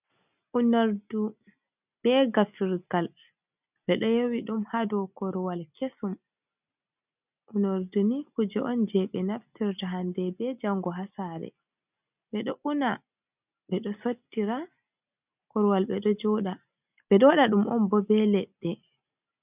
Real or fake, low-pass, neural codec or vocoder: real; 3.6 kHz; none